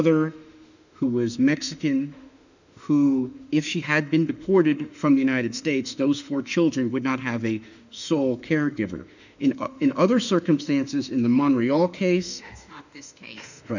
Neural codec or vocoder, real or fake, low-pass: autoencoder, 48 kHz, 32 numbers a frame, DAC-VAE, trained on Japanese speech; fake; 7.2 kHz